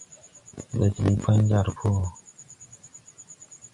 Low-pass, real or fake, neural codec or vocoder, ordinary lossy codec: 10.8 kHz; real; none; AAC, 64 kbps